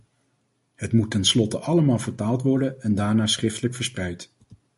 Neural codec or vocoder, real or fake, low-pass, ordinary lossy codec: none; real; 14.4 kHz; MP3, 48 kbps